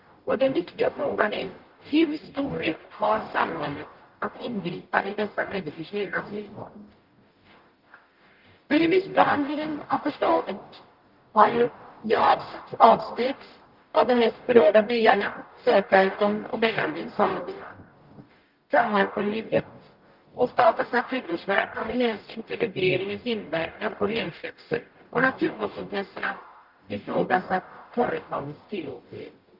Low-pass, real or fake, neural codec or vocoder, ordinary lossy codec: 5.4 kHz; fake; codec, 44.1 kHz, 0.9 kbps, DAC; Opus, 32 kbps